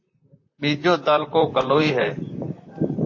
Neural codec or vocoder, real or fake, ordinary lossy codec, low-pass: vocoder, 44.1 kHz, 128 mel bands, Pupu-Vocoder; fake; MP3, 32 kbps; 7.2 kHz